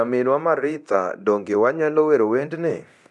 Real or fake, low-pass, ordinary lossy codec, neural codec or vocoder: fake; none; none; codec, 24 kHz, 0.9 kbps, DualCodec